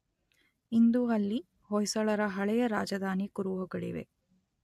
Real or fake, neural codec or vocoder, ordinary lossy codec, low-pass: real; none; MP3, 64 kbps; 14.4 kHz